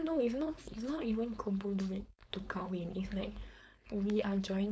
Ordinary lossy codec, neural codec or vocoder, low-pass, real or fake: none; codec, 16 kHz, 4.8 kbps, FACodec; none; fake